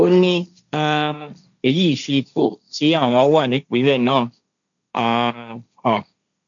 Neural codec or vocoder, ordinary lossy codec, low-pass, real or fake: codec, 16 kHz, 1.1 kbps, Voila-Tokenizer; none; 7.2 kHz; fake